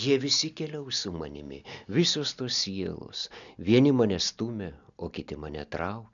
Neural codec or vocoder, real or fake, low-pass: none; real; 7.2 kHz